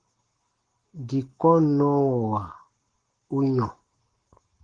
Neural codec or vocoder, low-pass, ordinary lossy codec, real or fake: codec, 44.1 kHz, 7.8 kbps, Pupu-Codec; 9.9 kHz; Opus, 16 kbps; fake